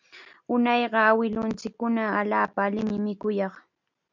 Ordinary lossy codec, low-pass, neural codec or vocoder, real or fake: MP3, 64 kbps; 7.2 kHz; none; real